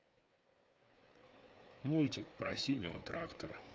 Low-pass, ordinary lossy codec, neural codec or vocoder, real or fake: none; none; codec, 16 kHz, 4 kbps, FreqCodec, larger model; fake